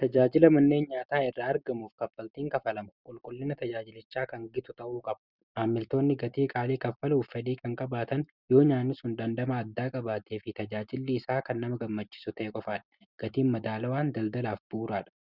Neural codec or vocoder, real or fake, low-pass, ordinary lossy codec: none; real; 5.4 kHz; Opus, 64 kbps